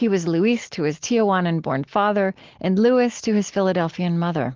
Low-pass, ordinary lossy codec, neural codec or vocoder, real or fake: 7.2 kHz; Opus, 16 kbps; none; real